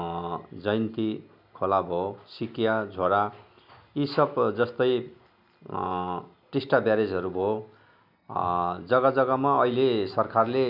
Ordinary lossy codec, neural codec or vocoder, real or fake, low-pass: none; none; real; 5.4 kHz